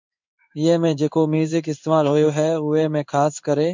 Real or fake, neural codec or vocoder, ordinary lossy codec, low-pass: fake; codec, 16 kHz in and 24 kHz out, 1 kbps, XY-Tokenizer; MP3, 64 kbps; 7.2 kHz